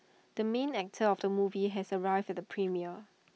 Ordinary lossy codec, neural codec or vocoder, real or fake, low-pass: none; none; real; none